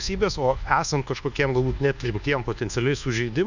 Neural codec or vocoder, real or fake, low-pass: codec, 24 kHz, 1.2 kbps, DualCodec; fake; 7.2 kHz